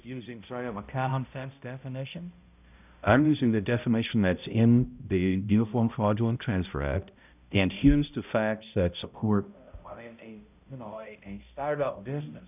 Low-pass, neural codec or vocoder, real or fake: 3.6 kHz; codec, 16 kHz, 0.5 kbps, X-Codec, HuBERT features, trained on balanced general audio; fake